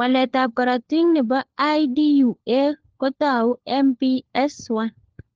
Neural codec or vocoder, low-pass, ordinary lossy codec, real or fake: codec, 16 kHz, 4 kbps, FunCodec, trained on LibriTTS, 50 frames a second; 7.2 kHz; Opus, 16 kbps; fake